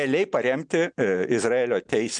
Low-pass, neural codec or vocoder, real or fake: 9.9 kHz; none; real